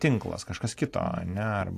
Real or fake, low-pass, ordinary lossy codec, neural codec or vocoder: real; 14.4 kHz; AAC, 64 kbps; none